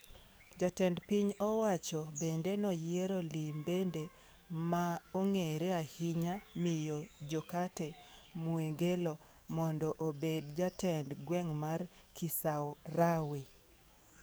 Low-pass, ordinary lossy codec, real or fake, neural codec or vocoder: none; none; fake; codec, 44.1 kHz, 7.8 kbps, DAC